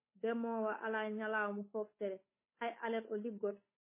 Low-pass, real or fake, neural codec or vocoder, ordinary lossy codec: 3.6 kHz; fake; codec, 16 kHz, 8 kbps, FunCodec, trained on Chinese and English, 25 frames a second; MP3, 16 kbps